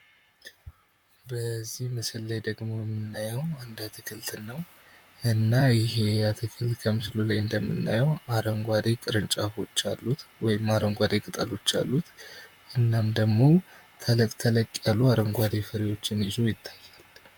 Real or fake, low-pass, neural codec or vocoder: fake; 19.8 kHz; vocoder, 44.1 kHz, 128 mel bands, Pupu-Vocoder